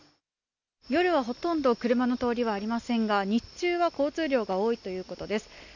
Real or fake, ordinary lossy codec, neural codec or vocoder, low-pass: real; none; none; 7.2 kHz